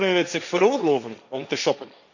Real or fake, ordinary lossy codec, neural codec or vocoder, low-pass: fake; none; codec, 16 kHz, 1.1 kbps, Voila-Tokenizer; 7.2 kHz